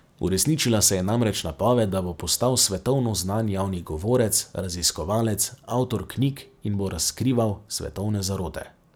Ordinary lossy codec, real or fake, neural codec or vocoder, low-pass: none; real; none; none